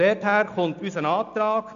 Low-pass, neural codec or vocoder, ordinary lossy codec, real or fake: 7.2 kHz; none; none; real